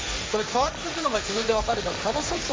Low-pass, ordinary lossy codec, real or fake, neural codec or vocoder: none; none; fake; codec, 16 kHz, 1.1 kbps, Voila-Tokenizer